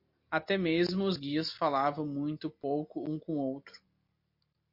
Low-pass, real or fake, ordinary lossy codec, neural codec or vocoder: 5.4 kHz; real; MP3, 32 kbps; none